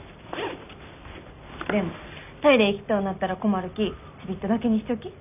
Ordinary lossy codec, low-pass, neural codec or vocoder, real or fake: none; 3.6 kHz; none; real